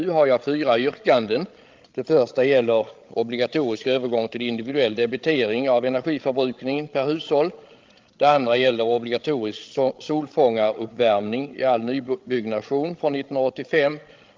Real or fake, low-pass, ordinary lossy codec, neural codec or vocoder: fake; 7.2 kHz; Opus, 32 kbps; codec, 16 kHz, 16 kbps, FreqCodec, larger model